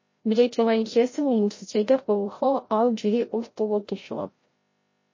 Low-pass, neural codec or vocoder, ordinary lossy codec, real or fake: 7.2 kHz; codec, 16 kHz, 0.5 kbps, FreqCodec, larger model; MP3, 32 kbps; fake